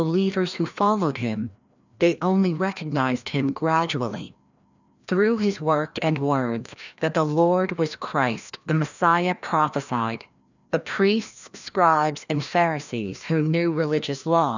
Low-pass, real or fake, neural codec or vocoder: 7.2 kHz; fake; codec, 16 kHz, 1 kbps, FreqCodec, larger model